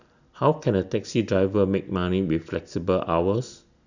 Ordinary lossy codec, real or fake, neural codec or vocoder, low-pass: none; real; none; 7.2 kHz